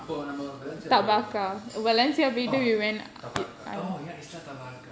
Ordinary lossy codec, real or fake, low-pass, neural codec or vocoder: none; real; none; none